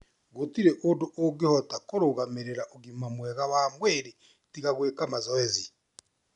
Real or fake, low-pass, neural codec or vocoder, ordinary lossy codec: real; 10.8 kHz; none; none